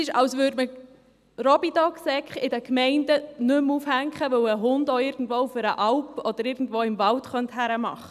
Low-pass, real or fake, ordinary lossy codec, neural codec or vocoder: 14.4 kHz; real; none; none